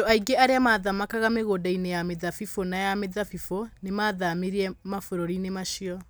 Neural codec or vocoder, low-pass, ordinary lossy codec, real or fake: none; none; none; real